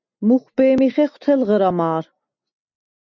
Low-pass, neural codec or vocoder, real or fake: 7.2 kHz; none; real